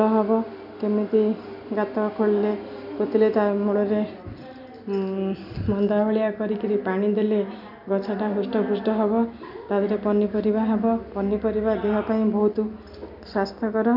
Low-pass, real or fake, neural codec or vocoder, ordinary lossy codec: 5.4 kHz; real; none; none